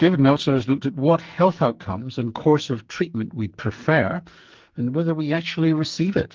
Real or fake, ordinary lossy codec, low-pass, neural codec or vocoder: fake; Opus, 24 kbps; 7.2 kHz; codec, 44.1 kHz, 2.6 kbps, SNAC